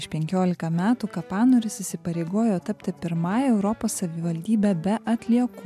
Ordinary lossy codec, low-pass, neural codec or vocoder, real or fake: MP3, 96 kbps; 14.4 kHz; none; real